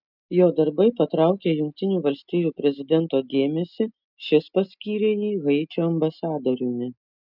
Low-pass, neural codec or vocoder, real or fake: 5.4 kHz; none; real